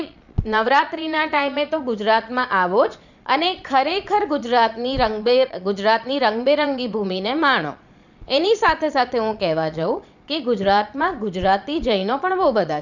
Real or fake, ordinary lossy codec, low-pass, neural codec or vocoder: fake; none; 7.2 kHz; vocoder, 22.05 kHz, 80 mel bands, Vocos